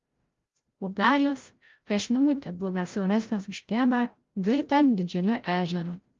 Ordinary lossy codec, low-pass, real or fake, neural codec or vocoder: Opus, 32 kbps; 7.2 kHz; fake; codec, 16 kHz, 0.5 kbps, FreqCodec, larger model